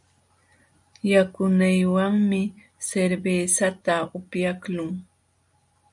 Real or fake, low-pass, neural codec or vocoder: real; 10.8 kHz; none